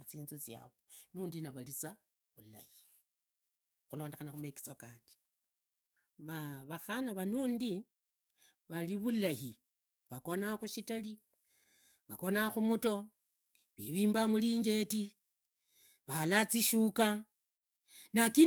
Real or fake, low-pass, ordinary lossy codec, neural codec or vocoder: fake; none; none; codec, 44.1 kHz, 7.8 kbps, DAC